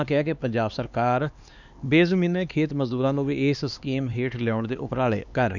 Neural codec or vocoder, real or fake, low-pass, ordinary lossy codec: codec, 16 kHz, 2 kbps, X-Codec, HuBERT features, trained on LibriSpeech; fake; 7.2 kHz; none